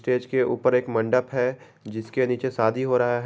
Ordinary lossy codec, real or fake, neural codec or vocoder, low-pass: none; real; none; none